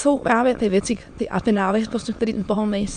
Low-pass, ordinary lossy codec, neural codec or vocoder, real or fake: 9.9 kHz; AAC, 64 kbps; autoencoder, 22.05 kHz, a latent of 192 numbers a frame, VITS, trained on many speakers; fake